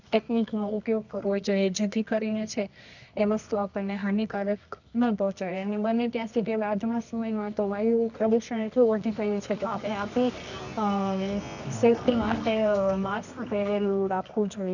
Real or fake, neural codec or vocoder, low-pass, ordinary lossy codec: fake; codec, 24 kHz, 0.9 kbps, WavTokenizer, medium music audio release; 7.2 kHz; none